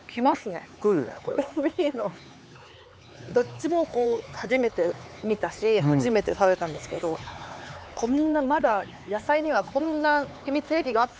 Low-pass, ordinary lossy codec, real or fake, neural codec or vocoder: none; none; fake; codec, 16 kHz, 4 kbps, X-Codec, HuBERT features, trained on LibriSpeech